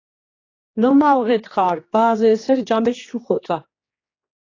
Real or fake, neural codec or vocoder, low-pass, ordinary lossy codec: fake; codec, 16 kHz, 2 kbps, X-Codec, HuBERT features, trained on general audio; 7.2 kHz; AAC, 32 kbps